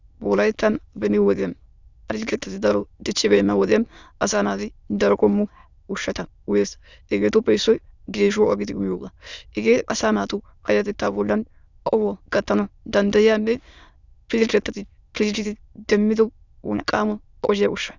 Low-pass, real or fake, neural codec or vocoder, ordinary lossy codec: 7.2 kHz; fake; autoencoder, 22.05 kHz, a latent of 192 numbers a frame, VITS, trained on many speakers; Opus, 64 kbps